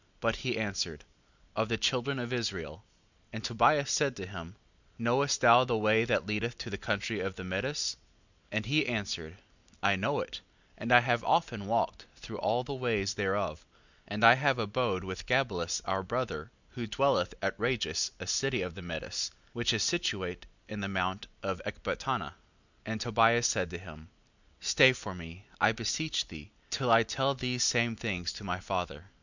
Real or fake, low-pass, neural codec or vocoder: real; 7.2 kHz; none